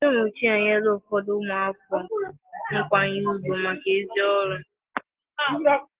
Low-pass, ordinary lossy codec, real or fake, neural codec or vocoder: 3.6 kHz; Opus, 16 kbps; real; none